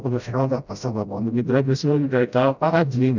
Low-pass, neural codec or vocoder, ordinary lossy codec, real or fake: 7.2 kHz; codec, 16 kHz, 0.5 kbps, FreqCodec, smaller model; none; fake